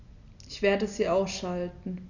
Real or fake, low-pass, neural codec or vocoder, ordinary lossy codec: real; 7.2 kHz; none; none